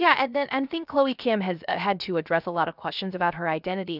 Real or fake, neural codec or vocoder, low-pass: fake; codec, 16 kHz, 0.7 kbps, FocalCodec; 5.4 kHz